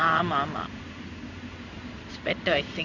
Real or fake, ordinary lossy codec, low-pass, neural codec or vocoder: fake; none; 7.2 kHz; codec, 16 kHz in and 24 kHz out, 1 kbps, XY-Tokenizer